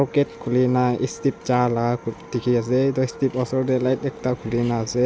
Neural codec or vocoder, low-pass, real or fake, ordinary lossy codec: none; none; real; none